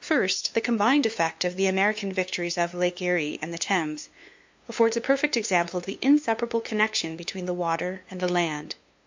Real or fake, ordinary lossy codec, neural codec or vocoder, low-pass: fake; MP3, 48 kbps; codec, 16 kHz, 2 kbps, FunCodec, trained on LibriTTS, 25 frames a second; 7.2 kHz